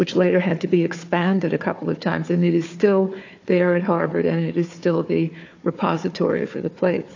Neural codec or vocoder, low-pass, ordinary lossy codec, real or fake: codec, 16 kHz, 4 kbps, FunCodec, trained on Chinese and English, 50 frames a second; 7.2 kHz; AAC, 48 kbps; fake